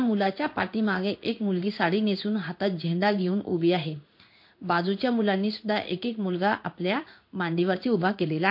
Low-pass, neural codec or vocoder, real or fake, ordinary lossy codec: 5.4 kHz; codec, 16 kHz in and 24 kHz out, 1 kbps, XY-Tokenizer; fake; MP3, 32 kbps